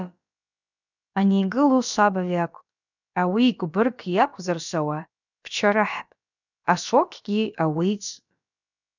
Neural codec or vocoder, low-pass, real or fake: codec, 16 kHz, about 1 kbps, DyCAST, with the encoder's durations; 7.2 kHz; fake